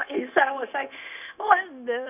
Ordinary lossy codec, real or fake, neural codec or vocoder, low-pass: none; fake; codec, 16 kHz, 0.9 kbps, LongCat-Audio-Codec; 3.6 kHz